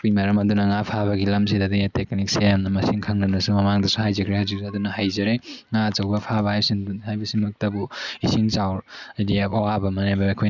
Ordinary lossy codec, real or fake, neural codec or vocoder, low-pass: none; real; none; 7.2 kHz